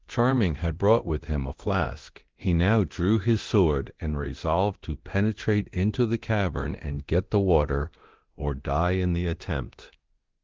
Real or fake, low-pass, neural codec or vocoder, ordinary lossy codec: fake; 7.2 kHz; codec, 24 kHz, 0.9 kbps, DualCodec; Opus, 16 kbps